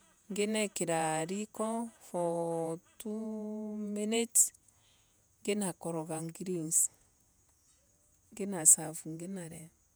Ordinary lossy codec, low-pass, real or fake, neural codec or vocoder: none; none; fake; vocoder, 48 kHz, 128 mel bands, Vocos